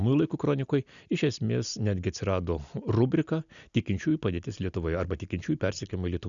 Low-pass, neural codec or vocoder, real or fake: 7.2 kHz; none; real